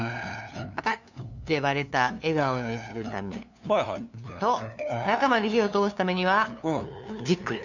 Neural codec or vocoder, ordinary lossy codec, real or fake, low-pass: codec, 16 kHz, 2 kbps, FunCodec, trained on LibriTTS, 25 frames a second; none; fake; 7.2 kHz